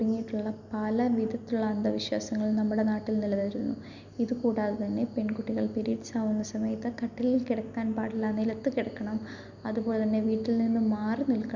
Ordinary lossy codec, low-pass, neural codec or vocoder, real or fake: none; 7.2 kHz; none; real